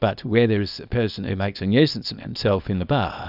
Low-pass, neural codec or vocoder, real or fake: 5.4 kHz; codec, 24 kHz, 0.9 kbps, WavTokenizer, small release; fake